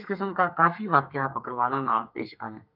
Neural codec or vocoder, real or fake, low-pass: codec, 32 kHz, 1.9 kbps, SNAC; fake; 5.4 kHz